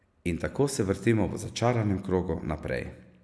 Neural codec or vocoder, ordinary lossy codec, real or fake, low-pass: none; none; real; none